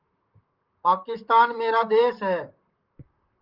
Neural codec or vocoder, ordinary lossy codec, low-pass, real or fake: vocoder, 44.1 kHz, 128 mel bands, Pupu-Vocoder; Opus, 24 kbps; 5.4 kHz; fake